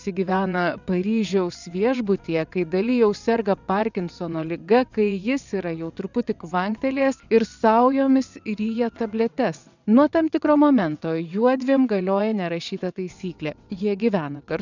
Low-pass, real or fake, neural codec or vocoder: 7.2 kHz; fake; vocoder, 22.05 kHz, 80 mel bands, WaveNeXt